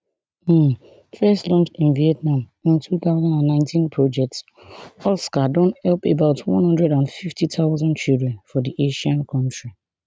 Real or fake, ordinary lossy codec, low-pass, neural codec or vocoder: real; none; none; none